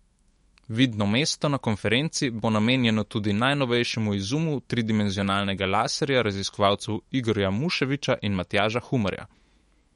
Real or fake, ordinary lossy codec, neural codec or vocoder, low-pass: fake; MP3, 48 kbps; autoencoder, 48 kHz, 128 numbers a frame, DAC-VAE, trained on Japanese speech; 19.8 kHz